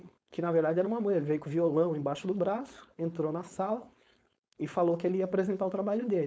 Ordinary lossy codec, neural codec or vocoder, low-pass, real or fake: none; codec, 16 kHz, 4.8 kbps, FACodec; none; fake